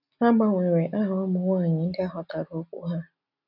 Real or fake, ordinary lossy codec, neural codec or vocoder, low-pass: real; none; none; 5.4 kHz